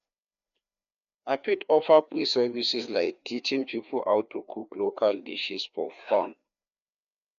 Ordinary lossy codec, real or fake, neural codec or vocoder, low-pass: none; fake; codec, 16 kHz, 2 kbps, FreqCodec, larger model; 7.2 kHz